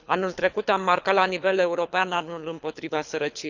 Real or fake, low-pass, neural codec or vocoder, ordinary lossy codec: fake; 7.2 kHz; codec, 24 kHz, 6 kbps, HILCodec; none